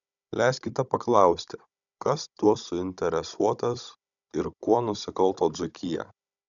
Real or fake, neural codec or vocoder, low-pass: fake; codec, 16 kHz, 16 kbps, FunCodec, trained on Chinese and English, 50 frames a second; 7.2 kHz